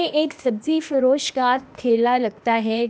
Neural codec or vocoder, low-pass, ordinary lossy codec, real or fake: codec, 16 kHz, 0.8 kbps, ZipCodec; none; none; fake